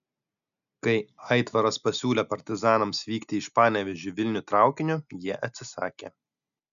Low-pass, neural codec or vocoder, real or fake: 7.2 kHz; none; real